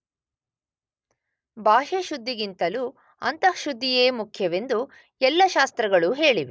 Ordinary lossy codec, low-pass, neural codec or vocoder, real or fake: none; none; none; real